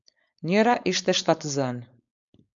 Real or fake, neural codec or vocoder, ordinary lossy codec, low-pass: fake; codec, 16 kHz, 4.8 kbps, FACodec; MP3, 64 kbps; 7.2 kHz